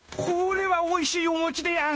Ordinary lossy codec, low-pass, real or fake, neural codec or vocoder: none; none; fake; codec, 16 kHz, 0.9 kbps, LongCat-Audio-Codec